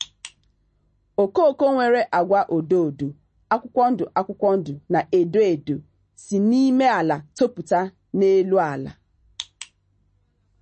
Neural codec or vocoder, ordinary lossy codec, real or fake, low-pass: none; MP3, 32 kbps; real; 10.8 kHz